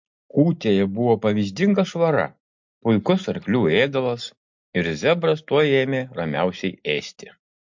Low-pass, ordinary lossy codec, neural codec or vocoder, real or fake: 7.2 kHz; MP3, 48 kbps; none; real